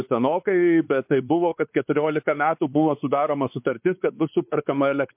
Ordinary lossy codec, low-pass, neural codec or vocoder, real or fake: MP3, 32 kbps; 3.6 kHz; codec, 16 kHz, 4 kbps, X-Codec, WavLM features, trained on Multilingual LibriSpeech; fake